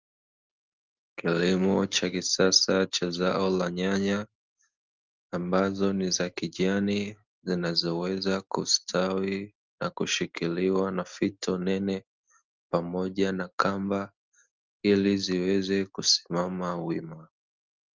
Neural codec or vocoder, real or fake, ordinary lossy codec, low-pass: none; real; Opus, 24 kbps; 7.2 kHz